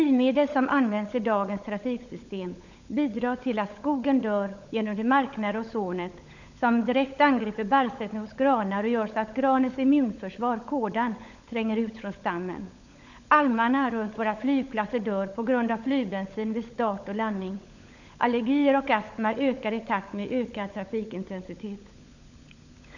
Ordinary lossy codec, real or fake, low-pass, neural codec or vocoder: none; fake; 7.2 kHz; codec, 16 kHz, 16 kbps, FunCodec, trained on LibriTTS, 50 frames a second